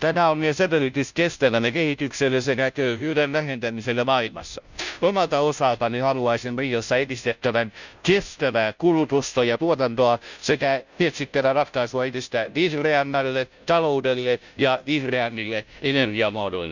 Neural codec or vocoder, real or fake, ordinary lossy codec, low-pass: codec, 16 kHz, 0.5 kbps, FunCodec, trained on Chinese and English, 25 frames a second; fake; none; 7.2 kHz